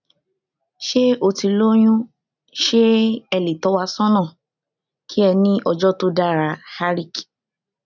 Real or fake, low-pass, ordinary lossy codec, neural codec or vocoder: real; 7.2 kHz; none; none